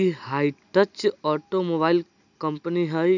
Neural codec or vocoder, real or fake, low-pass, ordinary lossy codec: none; real; 7.2 kHz; none